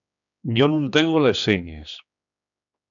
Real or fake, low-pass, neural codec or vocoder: fake; 7.2 kHz; codec, 16 kHz, 2 kbps, X-Codec, HuBERT features, trained on general audio